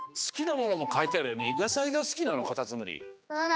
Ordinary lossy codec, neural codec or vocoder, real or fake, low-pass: none; codec, 16 kHz, 2 kbps, X-Codec, HuBERT features, trained on general audio; fake; none